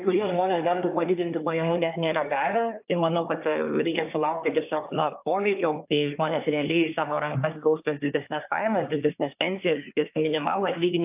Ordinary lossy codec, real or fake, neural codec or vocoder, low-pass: AAC, 32 kbps; fake; codec, 24 kHz, 1 kbps, SNAC; 3.6 kHz